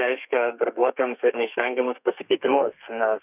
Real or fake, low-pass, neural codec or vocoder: fake; 3.6 kHz; codec, 32 kHz, 1.9 kbps, SNAC